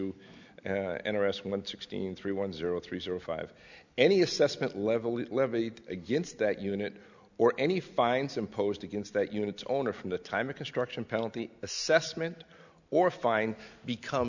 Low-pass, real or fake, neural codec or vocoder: 7.2 kHz; real; none